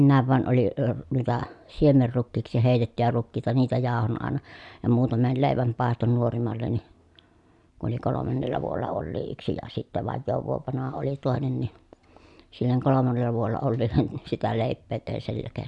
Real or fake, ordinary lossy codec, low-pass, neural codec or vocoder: real; none; 10.8 kHz; none